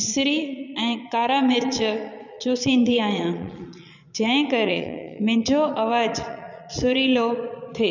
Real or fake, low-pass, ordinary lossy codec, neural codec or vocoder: fake; 7.2 kHz; none; vocoder, 22.05 kHz, 80 mel bands, Vocos